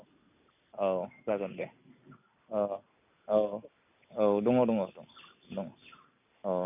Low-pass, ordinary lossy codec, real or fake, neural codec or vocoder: 3.6 kHz; none; real; none